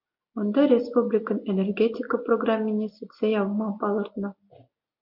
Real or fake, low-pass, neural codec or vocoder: real; 5.4 kHz; none